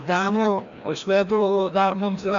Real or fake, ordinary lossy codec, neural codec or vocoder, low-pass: fake; MP3, 64 kbps; codec, 16 kHz, 1 kbps, FreqCodec, larger model; 7.2 kHz